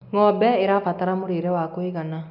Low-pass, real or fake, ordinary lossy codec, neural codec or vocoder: 5.4 kHz; real; none; none